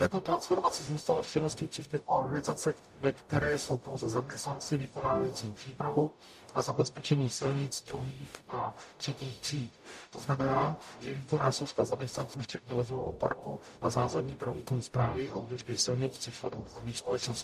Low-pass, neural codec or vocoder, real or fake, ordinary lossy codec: 14.4 kHz; codec, 44.1 kHz, 0.9 kbps, DAC; fake; MP3, 64 kbps